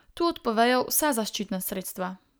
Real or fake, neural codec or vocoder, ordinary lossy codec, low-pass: real; none; none; none